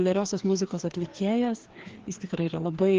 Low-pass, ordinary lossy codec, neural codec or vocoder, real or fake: 7.2 kHz; Opus, 16 kbps; codec, 16 kHz, 2 kbps, FreqCodec, larger model; fake